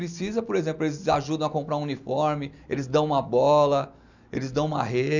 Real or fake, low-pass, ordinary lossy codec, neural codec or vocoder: real; 7.2 kHz; none; none